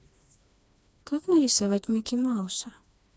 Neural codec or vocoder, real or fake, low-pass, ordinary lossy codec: codec, 16 kHz, 2 kbps, FreqCodec, smaller model; fake; none; none